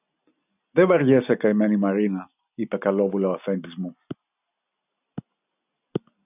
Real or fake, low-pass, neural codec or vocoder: real; 3.6 kHz; none